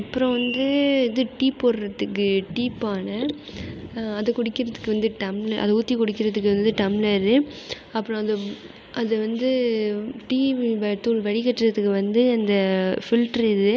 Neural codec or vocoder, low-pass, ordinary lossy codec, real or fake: none; none; none; real